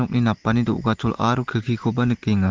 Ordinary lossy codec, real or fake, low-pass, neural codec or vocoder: Opus, 16 kbps; real; 7.2 kHz; none